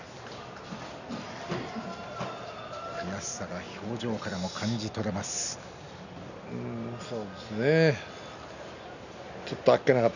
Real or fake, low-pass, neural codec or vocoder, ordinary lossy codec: real; 7.2 kHz; none; none